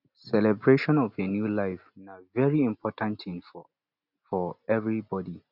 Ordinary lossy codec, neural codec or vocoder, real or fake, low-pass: none; none; real; 5.4 kHz